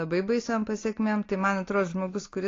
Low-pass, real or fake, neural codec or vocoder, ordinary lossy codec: 7.2 kHz; real; none; AAC, 32 kbps